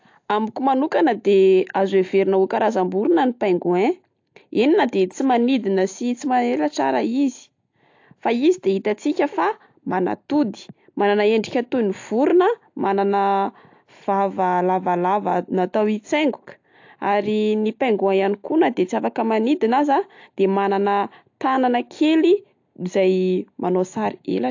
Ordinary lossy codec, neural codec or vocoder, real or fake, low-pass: AAC, 48 kbps; none; real; 7.2 kHz